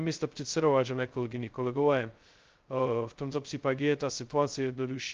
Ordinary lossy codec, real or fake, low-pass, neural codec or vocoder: Opus, 32 kbps; fake; 7.2 kHz; codec, 16 kHz, 0.2 kbps, FocalCodec